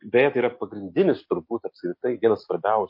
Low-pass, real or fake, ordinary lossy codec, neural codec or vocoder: 5.4 kHz; real; MP3, 32 kbps; none